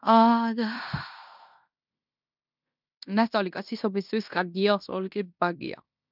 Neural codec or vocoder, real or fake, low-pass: codec, 16 kHz in and 24 kHz out, 0.9 kbps, LongCat-Audio-Codec, fine tuned four codebook decoder; fake; 5.4 kHz